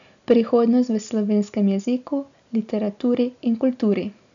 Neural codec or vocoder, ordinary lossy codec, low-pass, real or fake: none; none; 7.2 kHz; real